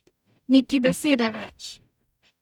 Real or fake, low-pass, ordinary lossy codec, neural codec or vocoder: fake; 19.8 kHz; none; codec, 44.1 kHz, 0.9 kbps, DAC